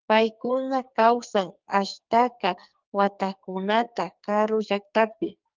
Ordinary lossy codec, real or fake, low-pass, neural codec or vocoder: Opus, 24 kbps; fake; 7.2 kHz; codec, 44.1 kHz, 2.6 kbps, SNAC